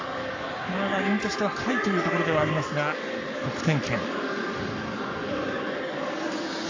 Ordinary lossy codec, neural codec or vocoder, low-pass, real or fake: AAC, 48 kbps; codec, 44.1 kHz, 7.8 kbps, Pupu-Codec; 7.2 kHz; fake